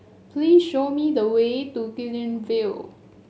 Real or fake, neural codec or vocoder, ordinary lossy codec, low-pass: real; none; none; none